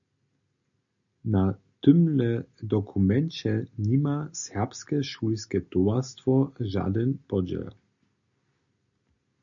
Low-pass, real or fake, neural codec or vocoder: 7.2 kHz; real; none